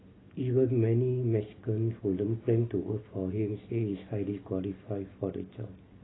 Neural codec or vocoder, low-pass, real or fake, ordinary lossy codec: none; 7.2 kHz; real; AAC, 16 kbps